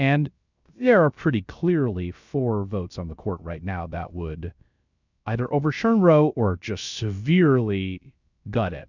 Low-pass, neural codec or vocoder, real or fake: 7.2 kHz; codec, 16 kHz, 0.3 kbps, FocalCodec; fake